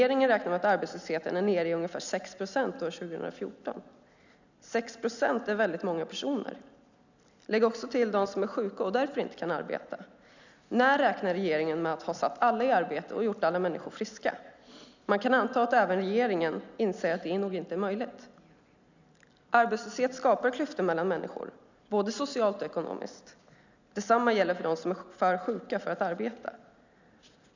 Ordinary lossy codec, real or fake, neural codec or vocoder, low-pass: none; real; none; 7.2 kHz